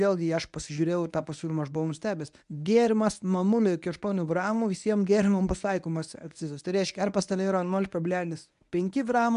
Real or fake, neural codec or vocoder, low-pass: fake; codec, 24 kHz, 0.9 kbps, WavTokenizer, medium speech release version 1; 10.8 kHz